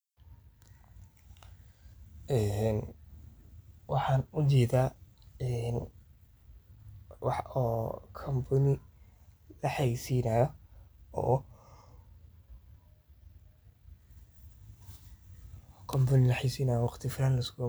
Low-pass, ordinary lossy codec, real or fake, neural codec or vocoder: none; none; real; none